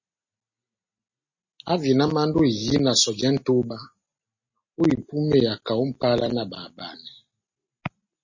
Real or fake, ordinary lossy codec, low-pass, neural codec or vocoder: real; MP3, 32 kbps; 7.2 kHz; none